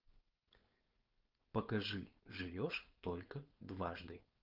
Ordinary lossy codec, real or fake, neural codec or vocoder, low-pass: Opus, 24 kbps; fake; codec, 16 kHz, 4.8 kbps, FACodec; 5.4 kHz